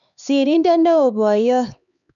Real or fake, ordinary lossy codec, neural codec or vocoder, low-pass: fake; none; codec, 16 kHz, 4 kbps, X-Codec, HuBERT features, trained on LibriSpeech; 7.2 kHz